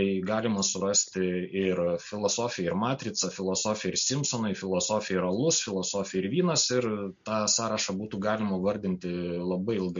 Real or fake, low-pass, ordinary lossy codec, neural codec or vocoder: real; 7.2 kHz; MP3, 48 kbps; none